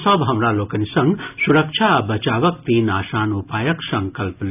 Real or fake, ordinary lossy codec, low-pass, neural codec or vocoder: real; none; 3.6 kHz; none